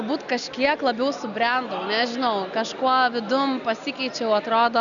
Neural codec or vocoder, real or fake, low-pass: none; real; 7.2 kHz